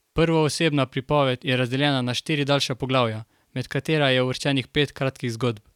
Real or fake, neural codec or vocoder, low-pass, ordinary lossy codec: real; none; 19.8 kHz; none